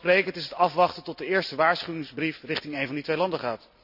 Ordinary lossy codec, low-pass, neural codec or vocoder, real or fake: none; 5.4 kHz; none; real